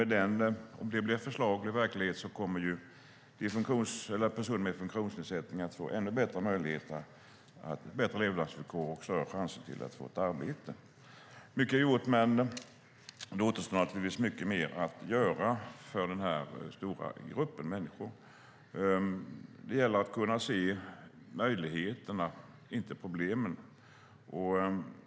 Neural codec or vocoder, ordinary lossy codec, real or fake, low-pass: none; none; real; none